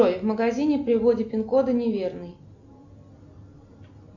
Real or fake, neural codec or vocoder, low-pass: real; none; 7.2 kHz